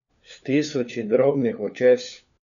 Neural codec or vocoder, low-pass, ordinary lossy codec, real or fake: codec, 16 kHz, 4 kbps, FunCodec, trained on LibriTTS, 50 frames a second; 7.2 kHz; none; fake